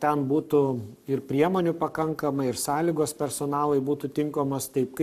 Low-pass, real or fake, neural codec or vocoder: 14.4 kHz; fake; codec, 44.1 kHz, 7.8 kbps, Pupu-Codec